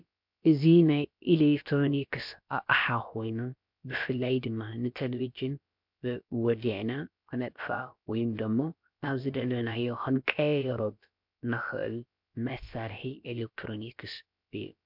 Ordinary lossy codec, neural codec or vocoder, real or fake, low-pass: MP3, 48 kbps; codec, 16 kHz, about 1 kbps, DyCAST, with the encoder's durations; fake; 5.4 kHz